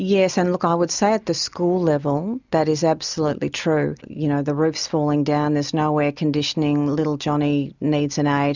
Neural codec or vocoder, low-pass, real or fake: none; 7.2 kHz; real